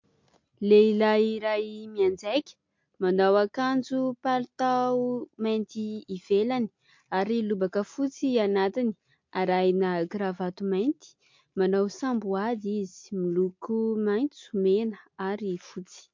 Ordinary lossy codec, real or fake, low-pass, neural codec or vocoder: MP3, 48 kbps; real; 7.2 kHz; none